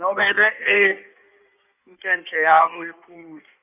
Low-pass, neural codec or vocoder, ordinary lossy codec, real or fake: 3.6 kHz; codec, 16 kHz in and 24 kHz out, 1.1 kbps, FireRedTTS-2 codec; none; fake